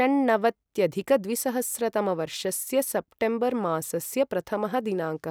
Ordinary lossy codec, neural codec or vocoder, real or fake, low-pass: none; none; real; none